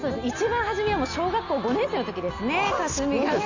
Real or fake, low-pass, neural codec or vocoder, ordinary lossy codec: real; 7.2 kHz; none; none